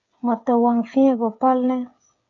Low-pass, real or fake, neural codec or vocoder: 7.2 kHz; fake; codec, 16 kHz, 8 kbps, FreqCodec, smaller model